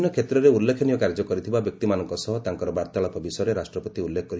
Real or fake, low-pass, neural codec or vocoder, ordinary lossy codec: real; none; none; none